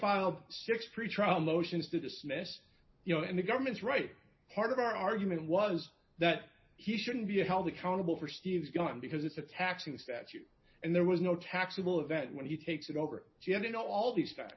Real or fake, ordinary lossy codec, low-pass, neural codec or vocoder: real; MP3, 24 kbps; 7.2 kHz; none